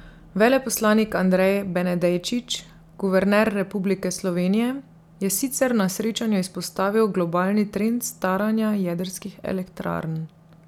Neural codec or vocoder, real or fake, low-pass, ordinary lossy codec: none; real; 19.8 kHz; none